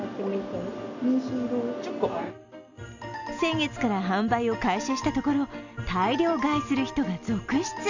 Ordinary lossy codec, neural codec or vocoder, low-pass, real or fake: none; none; 7.2 kHz; real